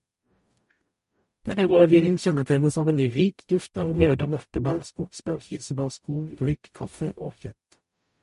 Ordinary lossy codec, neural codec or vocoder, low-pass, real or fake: MP3, 48 kbps; codec, 44.1 kHz, 0.9 kbps, DAC; 14.4 kHz; fake